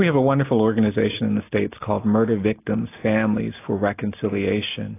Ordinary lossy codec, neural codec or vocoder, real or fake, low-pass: AAC, 24 kbps; none; real; 3.6 kHz